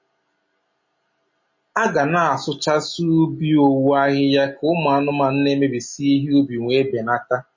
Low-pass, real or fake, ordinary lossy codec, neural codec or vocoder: 7.2 kHz; real; MP3, 32 kbps; none